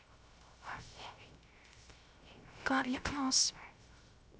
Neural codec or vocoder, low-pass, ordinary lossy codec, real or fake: codec, 16 kHz, 0.3 kbps, FocalCodec; none; none; fake